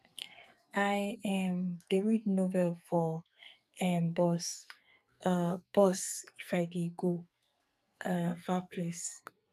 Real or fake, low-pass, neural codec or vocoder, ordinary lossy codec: fake; 14.4 kHz; codec, 44.1 kHz, 2.6 kbps, SNAC; none